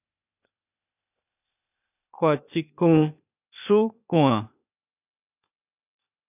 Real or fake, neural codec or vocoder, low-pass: fake; codec, 16 kHz, 0.8 kbps, ZipCodec; 3.6 kHz